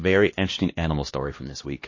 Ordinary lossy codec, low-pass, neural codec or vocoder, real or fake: MP3, 32 kbps; 7.2 kHz; codec, 16 kHz, 1 kbps, X-Codec, HuBERT features, trained on LibriSpeech; fake